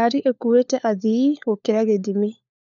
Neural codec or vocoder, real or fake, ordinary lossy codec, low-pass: codec, 16 kHz, 4 kbps, FunCodec, trained on LibriTTS, 50 frames a second; fake; none; 7.2 kHz